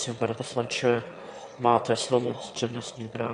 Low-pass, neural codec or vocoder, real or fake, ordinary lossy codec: 9.9 kHz; autoencoder, 22.05 kHz, a latent of 192 numbers a frame, VITS, trained on one speaker; fake; AAC, 64 kbps